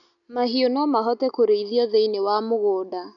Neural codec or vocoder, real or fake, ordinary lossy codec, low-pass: none; real; none; 7.2 kHz